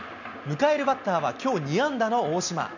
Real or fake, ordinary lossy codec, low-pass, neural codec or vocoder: real; none; 7.2 kHz; none